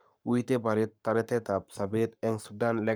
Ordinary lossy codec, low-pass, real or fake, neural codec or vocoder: none; none; fake; codec, 44.1 kHz, 7.8 kbps, Pupu-Codec